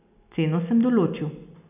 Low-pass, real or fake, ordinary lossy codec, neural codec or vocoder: 3.6 kHz; real; none; none